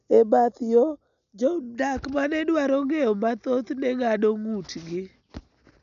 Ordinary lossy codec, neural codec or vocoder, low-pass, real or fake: none; none; 7.2 kHz; real